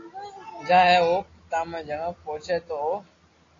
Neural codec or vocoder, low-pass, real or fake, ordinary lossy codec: none; 7.2 kHz; real; AAC, 32 kbps